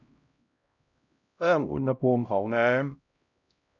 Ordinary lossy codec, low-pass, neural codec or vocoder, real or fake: none; 7.2 kHz; codec, 16 kHz, 0.5 kbps, X-Codec, HuBERT features, trained on LibriSpeech; fake